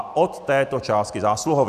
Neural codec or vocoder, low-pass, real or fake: none; 14.4 kHz; real